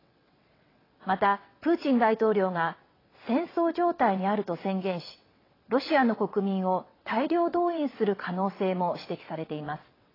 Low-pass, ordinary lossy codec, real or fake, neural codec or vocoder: 5.4 kHz; AAC, 24 kbps; fake; vocoder, 22.05 kHz, 80 mel bands, WaveNeXt